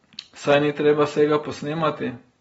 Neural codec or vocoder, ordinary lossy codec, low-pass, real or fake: none; AAC, 24 kbps; 19.8 kHz; real